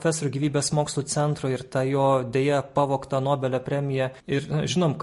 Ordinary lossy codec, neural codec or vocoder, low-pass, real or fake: MP3, 48 kbps; none; 14.4 kHz; real